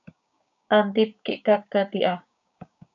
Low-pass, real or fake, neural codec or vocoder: 7.2 kHz; fake; codec, 16 kHz, 6 kbps, DAC